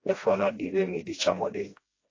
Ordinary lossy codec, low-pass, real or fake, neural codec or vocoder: AAC, 32 kbps; 7.2 kHz; fake; codec, 16 kHz, 1 kbps, FreqCodec, smaller model